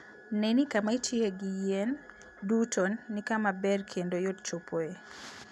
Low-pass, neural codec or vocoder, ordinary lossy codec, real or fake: none; none; none; real